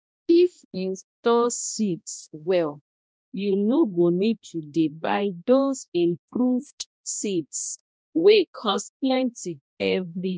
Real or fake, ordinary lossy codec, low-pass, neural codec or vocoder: fake; none; none; codec, 16 kHz, 1 kbps, X-Codec, HuBERT features, trained on balanced general audio